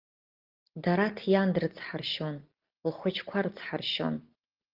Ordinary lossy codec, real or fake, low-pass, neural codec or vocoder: Opus, 32 kbps; real; 5.4 kHz; none